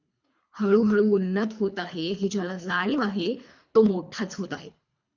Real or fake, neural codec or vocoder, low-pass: fake; codec, 24 kHz, 3 kbps, HILCodec; 7.2 kHz